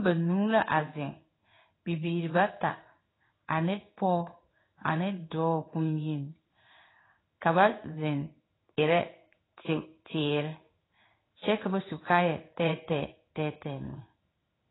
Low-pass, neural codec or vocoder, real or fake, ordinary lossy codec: 7.2 kHz; codec, 16 kHz in and 24 kHz out, 1 kbps, XY-Tokenizer; fake; AAC, 16 kbps